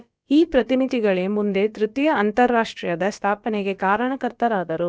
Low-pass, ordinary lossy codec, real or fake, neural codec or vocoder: none; none; fake; codec, 16 kHz, about 1 kbps, DyCAST, with the encoder's durations